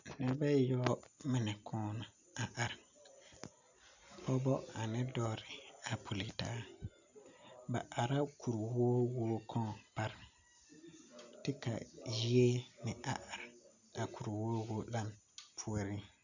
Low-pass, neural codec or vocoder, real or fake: 7.2 kHz; none; real